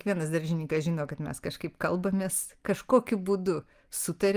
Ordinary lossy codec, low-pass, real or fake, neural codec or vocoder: Opus, 32 kbps; 14.4 kHz; real; none